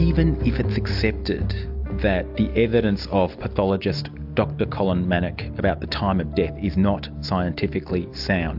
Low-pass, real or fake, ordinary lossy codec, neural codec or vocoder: 5.4 kHz; real; AAC, 48 kbps; none